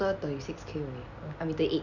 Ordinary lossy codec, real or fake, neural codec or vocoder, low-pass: none; real; none; 7.2 kHz